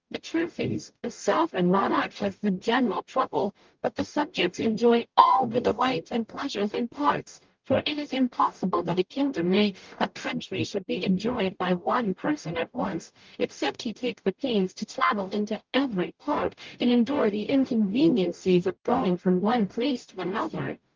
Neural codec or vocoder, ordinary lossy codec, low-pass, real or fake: codec, 44.1 kHz, 0.9 kbps, DAC; Opus, 32 kbps; 7.2 kHz; fake